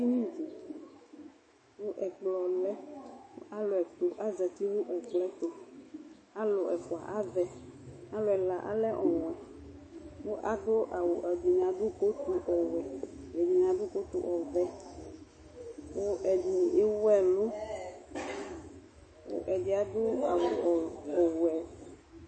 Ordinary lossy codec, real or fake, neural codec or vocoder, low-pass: MP3, 32 kbps; fake; autoencoder, 48 kHz, 128 numbers a frame, DAC-VAE, trained on Japanese speech; 9.9 kHz